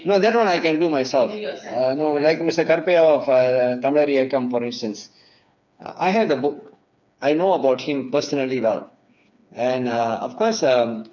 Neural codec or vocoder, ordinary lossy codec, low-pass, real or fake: codec, 16 kHz, 4 kbps, FreqCodec, smaller model; none; 7.2 kHz; fake